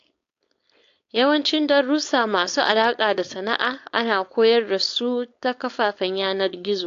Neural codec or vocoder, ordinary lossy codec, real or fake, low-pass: codec, 16 kHz, 4.8 kbps, FACodec; AAC, 48 kbps; fake; 7.2 kHz